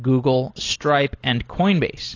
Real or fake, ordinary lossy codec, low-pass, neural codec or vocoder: real; AAC, 32 kbps; 7.2 kHz; none